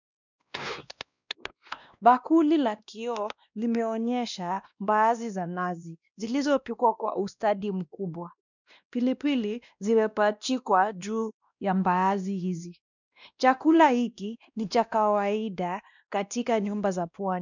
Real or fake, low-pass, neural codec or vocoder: fake; 7.2 kHz; codec, 16 kHz, 1 kbps, X-Codec, WavLM features, trained on Multilingual LibriSpeech